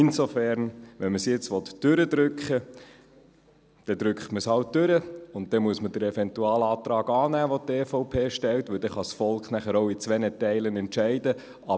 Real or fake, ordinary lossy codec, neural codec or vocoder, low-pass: real; none; none; none